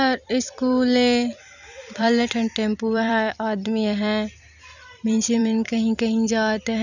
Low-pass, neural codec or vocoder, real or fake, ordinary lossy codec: 7.2 kHz; vocoder, 44.1 kHz, 128 mel bands every 256 samples, BigVGAN v2; fake; none